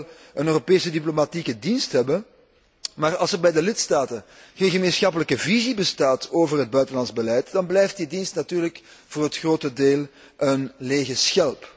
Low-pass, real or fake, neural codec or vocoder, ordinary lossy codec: none; real; none; none